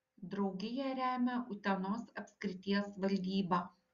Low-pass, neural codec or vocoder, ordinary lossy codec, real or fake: 7.2 kHz; none; Opus, 64 kbps; real